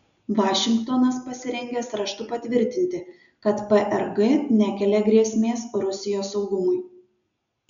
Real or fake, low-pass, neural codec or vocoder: real; 7.2 kHz; none